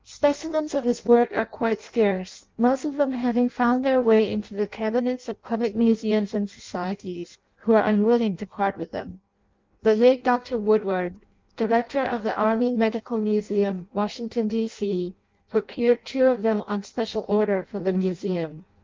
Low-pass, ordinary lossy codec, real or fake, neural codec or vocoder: 7.2 kHz; Opus, 24 kbps; fake; codec, 16 kHz in and 24 kHz out, 0.6 kbps, FireRedTTS-2 codec